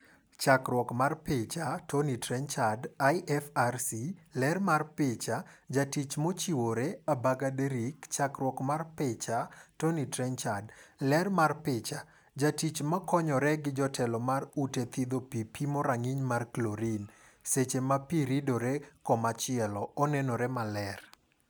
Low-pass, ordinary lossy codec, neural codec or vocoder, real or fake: none; none; none; real